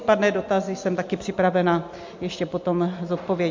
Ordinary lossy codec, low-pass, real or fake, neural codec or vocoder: MP3, 48 kbps; 7.2 kHz; real; none